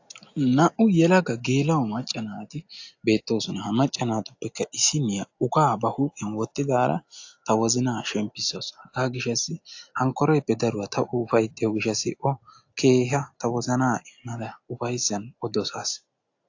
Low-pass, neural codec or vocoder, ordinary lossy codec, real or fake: 7.2 kHz; none; AAC, 48 kbps; real